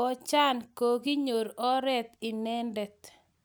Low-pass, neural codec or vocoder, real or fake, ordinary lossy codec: none; none; real; none